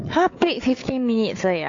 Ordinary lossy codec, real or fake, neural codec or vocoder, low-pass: AAC, 48 kbps; fake; codec, 16 kHz, 4 kbps, FunCodec, trained on Chinese and English, 50 frames a second; 7.2 kHz